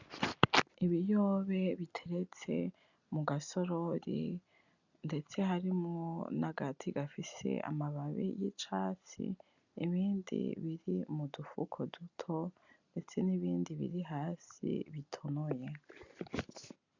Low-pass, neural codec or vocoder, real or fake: 7.2 kHz; none; real